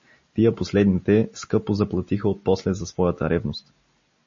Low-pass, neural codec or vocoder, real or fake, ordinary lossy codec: 7.2 kHz; none; real; MP3, 32 kbps